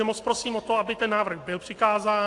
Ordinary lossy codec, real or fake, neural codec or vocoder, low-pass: AAC, 48 kbps; real; none; 10.8 kHz